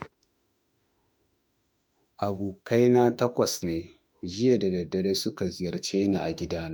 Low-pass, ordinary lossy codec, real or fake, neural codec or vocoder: none; none; fake; autoencoder, 48 kHz, 32 numbers a frame, DAC-VAE, trained on Japanese speech